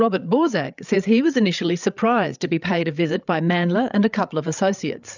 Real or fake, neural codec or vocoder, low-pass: fake; codec, 16 kHz, 8 kbps, FreqCodec, larger model; 7.2 kHz